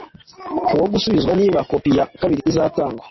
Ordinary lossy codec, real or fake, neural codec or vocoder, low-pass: MP3, 24 kbps; real; none; 7.2 kHz